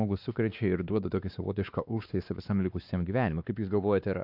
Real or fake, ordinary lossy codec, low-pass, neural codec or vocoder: fake; AAC, 48 kbps; 5.4 kHz; codec, 16 kHz, 2 kbps, X-Codec, WavLM features, trained on Multilingual LibriSpeech